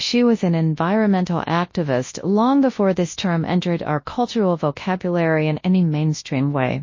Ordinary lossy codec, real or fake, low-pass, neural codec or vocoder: MP3, 32 kbps; fake; 7.2 kHz; codec, 24 kHz, 0.9 kbps, WavTokenizer, large speech release